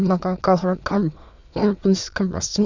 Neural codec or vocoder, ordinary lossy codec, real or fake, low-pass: autoencoder, 22.05 kHz, a latent of 192 numbers a frame, VITS, trained on many speakers; none; fake; 7.2 kHz